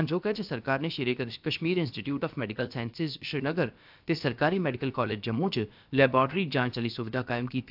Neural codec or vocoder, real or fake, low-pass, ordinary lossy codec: codec, 16 kHz, about 1 kbps, DyCAST, with the encoder's durations; fake; 5.4 kHz; AAC, 48 kbps